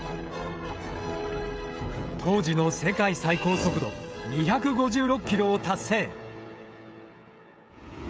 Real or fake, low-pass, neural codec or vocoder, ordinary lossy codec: fake; none; codec, 16 kHz, 16 kbps, FreqCodec, smaller model; none